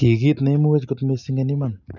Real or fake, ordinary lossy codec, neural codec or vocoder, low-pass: real; none; none; 7.2 kHz